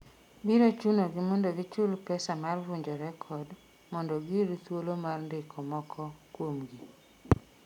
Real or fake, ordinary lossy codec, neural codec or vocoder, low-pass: real; none; none; 19.8 kHz